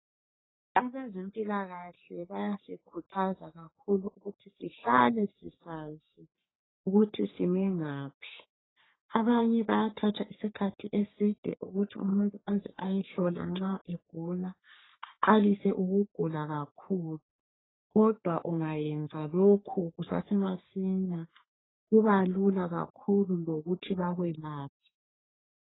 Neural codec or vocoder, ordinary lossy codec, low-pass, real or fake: codec, 44.1 kHz, 3.4 kbps, Pupu-Codec; AAC, 16 kbps; 7.2 kHz; fake